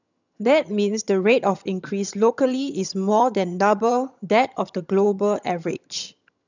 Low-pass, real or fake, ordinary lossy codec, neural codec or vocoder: 7.2 kHz; fake; none; vocoder, 22.05 kHz, 80 mel bands, HiFi-GAN